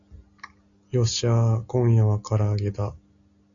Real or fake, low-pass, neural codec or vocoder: real; 7.2 kHz; none